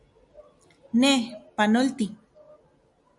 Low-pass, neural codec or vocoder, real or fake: 10.8 kHz; none; real